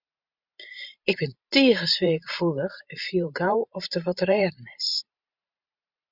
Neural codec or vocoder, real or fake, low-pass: vocoder, 44.1 kHz, 128 mel bands every 512 samples, BigVGAN v2; fake; 5.4 kHz